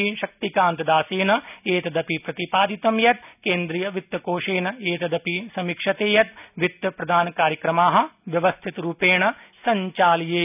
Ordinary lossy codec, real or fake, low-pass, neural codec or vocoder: none; real; 3.6 kHz; none